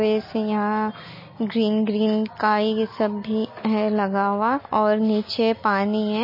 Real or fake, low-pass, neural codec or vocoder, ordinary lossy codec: real; 5.4 kHz; none; MP3, 24 kbps